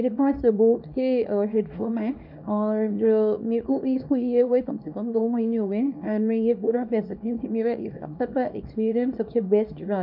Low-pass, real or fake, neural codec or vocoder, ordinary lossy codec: 5.4 kHz; fake; codec, 24 kHz, 0.9 kbps, WavTokenizer, small release; none